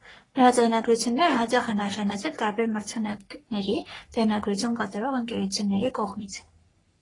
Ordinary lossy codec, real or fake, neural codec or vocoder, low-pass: AAC, 32 kbps; fake; codec, 44.1 kHz, 3.4 kbps, Pupu-Codec; 10.8 kHz